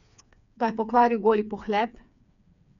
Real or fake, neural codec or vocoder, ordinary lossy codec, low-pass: fake; codec, 16 kHz, 4 kbps, FreqCodec, smaller model; none; 7.2 kHz